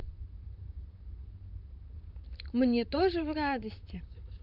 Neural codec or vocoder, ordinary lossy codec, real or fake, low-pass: vocoder, 44.1 kHz, 128 mel bands, Pupu-Vocoder; none; fake; 5.4 kHz